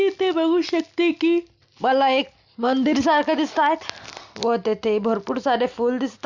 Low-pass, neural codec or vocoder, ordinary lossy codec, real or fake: 7.2 kHz; none; Opus, 64 kbps; real